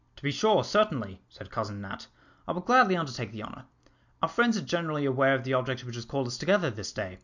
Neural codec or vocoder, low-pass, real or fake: none; 7.2 kHz; real